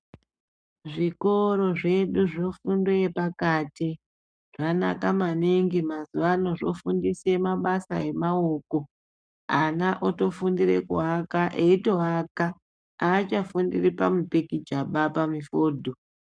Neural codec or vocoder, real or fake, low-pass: codec, 44.1 kHz, 7.8 kbps, Pupu-Codec; fake; 9.9 kHz